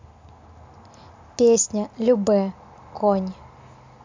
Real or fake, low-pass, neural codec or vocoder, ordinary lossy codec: real; 7.2 kHz; none; MP3, 64 kbps